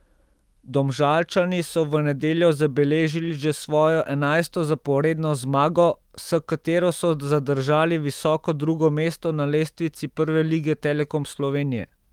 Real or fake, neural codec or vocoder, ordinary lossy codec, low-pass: fake; autoencoder, 48 kHz, 128 numbers a frame, DAC-VAE, trained on Japanese speech; Opus, 24 kbps; 19.8 kHz